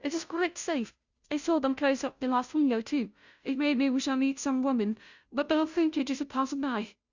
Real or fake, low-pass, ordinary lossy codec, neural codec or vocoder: fake; 7.2 kHz; Opus, 64 kbps; codec, 16 kHz, 0.5 kbps, FunCodec, trained on Chinese and English, 25 frames a second